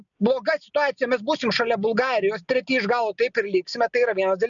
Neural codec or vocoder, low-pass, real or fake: none; 7.2 kHz; real